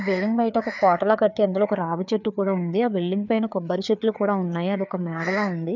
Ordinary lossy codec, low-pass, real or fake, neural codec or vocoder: none; 7.2 kHz; fake; codec, 16 kHz, 4 kbps, FreqCodec, larger model